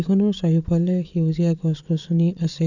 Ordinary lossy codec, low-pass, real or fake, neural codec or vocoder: none; 7.2 kHz; fake; vocoder, 22.05 kHz, 80 mel bands, WaveNeXt